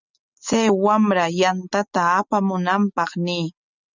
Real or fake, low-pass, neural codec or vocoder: real; 7.2 kHz; none